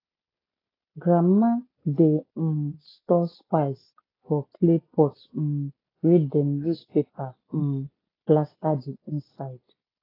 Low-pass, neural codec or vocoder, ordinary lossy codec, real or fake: 5.4 kHz; none; AAC, 24 kbps; real